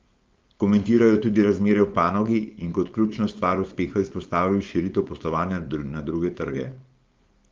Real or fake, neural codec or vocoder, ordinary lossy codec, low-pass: fake; codec, 16 kHz, 4.8 kbps, FACodec; Opus, 32 kbps; 7.2 kHz